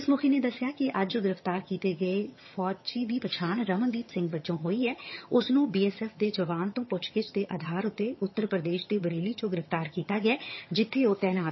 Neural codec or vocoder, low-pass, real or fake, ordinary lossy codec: vocoder, 22.05 kHz, 80 mel bands, HiFi-GAN; 7.2 kHz; fake; MP3, 24 kbps